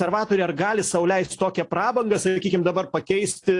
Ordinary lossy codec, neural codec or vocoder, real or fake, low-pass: AAC, 48 kbps; none; real; 10.8 kHz